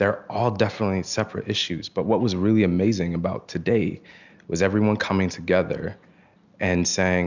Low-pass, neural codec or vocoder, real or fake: 7.2 kHz; none; real